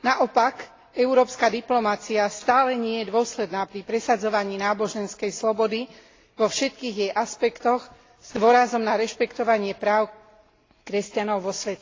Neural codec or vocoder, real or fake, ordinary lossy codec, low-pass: none; real; AAC, 32 kbps; 7.2 kHz